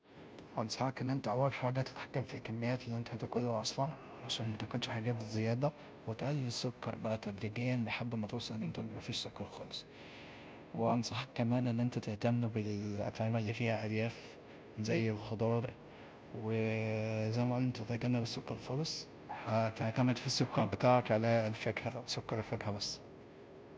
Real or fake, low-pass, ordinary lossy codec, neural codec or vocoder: fake; none; none; codec, 16 kHz, 0.5 kbps, FunCodec, trained on Chinese and English, 25 frames a second